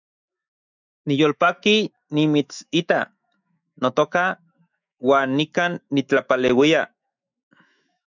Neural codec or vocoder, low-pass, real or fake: autoencoder, 48 kHz, 128 numbers a frame, DAC-VAE, trained on Japanese speech; 7.2 kHz; fake